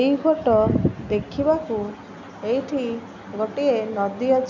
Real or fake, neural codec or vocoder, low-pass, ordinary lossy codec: real; none; 7.2 kHz; none